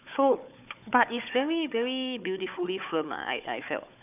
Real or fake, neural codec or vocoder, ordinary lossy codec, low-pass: fake; codec, 16 kHz, 4 kbps, FunCodec, trained on Chinese and English, 50 frames a second; none; 3.6 kHz